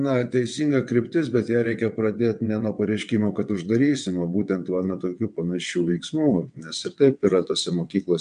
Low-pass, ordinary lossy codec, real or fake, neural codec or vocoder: 9.9 kHz; MP3, 64 kbps; fake; vocoder, 22.05 kHz, 80 mel bands, WaveNeXt